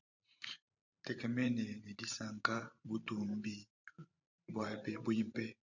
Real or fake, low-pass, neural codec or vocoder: fake; 7.2 kHz; vocoder, 44.1 kHz, 128 mel bands every 512 samples, BigVGAN v2